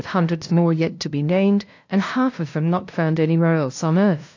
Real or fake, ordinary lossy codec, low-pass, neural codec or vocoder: fake; AAC, 48 kbps; 7.2 kHz; codec, 16 kHz, 0.5 kbps, FunCodec, trained on LibriTTS, 25 frames a second